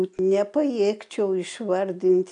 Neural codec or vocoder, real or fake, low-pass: none; real; 9.9 kHz